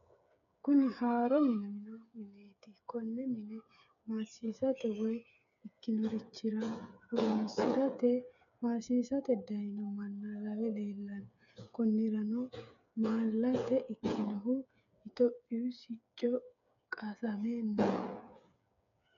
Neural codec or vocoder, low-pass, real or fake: codec, 16 kHz, 8 kbps, FreqCodec, smaller model; 7.2 kHz; fake